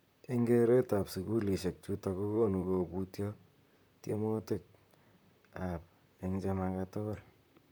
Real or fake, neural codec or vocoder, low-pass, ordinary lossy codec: fake; vocoder, 44.1 kHz, 128 mel bands, Pupu-Vocoder; none; none